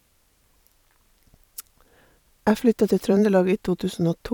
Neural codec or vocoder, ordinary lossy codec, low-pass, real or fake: vocoder, 44.1 kHz, 128 mel bands every 512 samples, BigVGAN v2; none; 19.8 kHz; fake